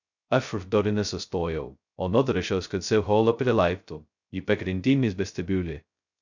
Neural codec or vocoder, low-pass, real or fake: codec, 16 kHz, 0.2 kbps, FocalCodec; 7.2 kHz; fake